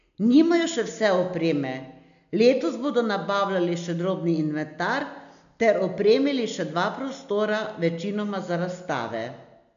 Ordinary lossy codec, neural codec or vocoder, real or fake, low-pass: MP3, 96 kbps; none; real; 7.2 kHz